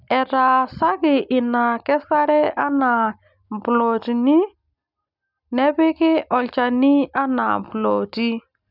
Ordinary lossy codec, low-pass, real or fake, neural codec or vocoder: none; 5.4 kHz; real; none